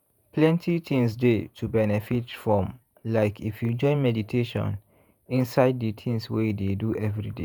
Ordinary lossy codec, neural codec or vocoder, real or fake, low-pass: none; vocoder, 48 kHz, 128 mel bands, Vocos; fake; none